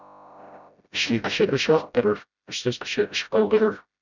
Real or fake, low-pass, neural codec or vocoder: fake; 7.2 kHz; codec, 16 kHz, 0.5 kbps, FreqCodec, smaller model